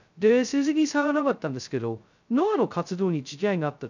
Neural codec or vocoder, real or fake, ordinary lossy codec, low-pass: codec, 16 kHz, 0.2 kbps, FocalCodec; fake; none; 7.2 kHz